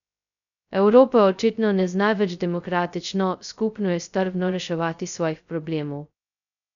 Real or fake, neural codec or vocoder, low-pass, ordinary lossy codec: fake; codec, 16 kHz, 0.2 kbps, FocalCodec; 7.2 kHz; none